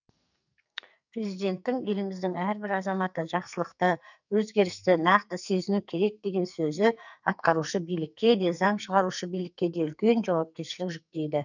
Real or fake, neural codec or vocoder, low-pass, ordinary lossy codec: fake; codec, 44.1 kHz, 2.6 kbps, SNAC; 7.2 kHz; none